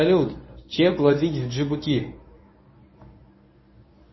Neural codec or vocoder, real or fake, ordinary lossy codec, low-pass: codec, 24 kHz, 0.9 kbps, WavTokenizer, medium speech release version 1; fake; MP3, 24 kbps; 7.2 kHz